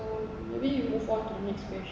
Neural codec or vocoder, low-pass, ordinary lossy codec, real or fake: none; none; none; real